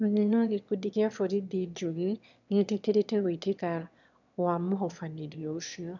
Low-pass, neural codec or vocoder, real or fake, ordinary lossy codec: 7.2 kHz; autoencoder, 22.05 kHz, a latent of 192 numbers a frame, VITS, trained on one speaker; fake; none